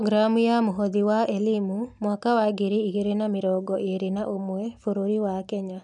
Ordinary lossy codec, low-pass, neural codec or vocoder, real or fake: none; 10.8 kHz; none; real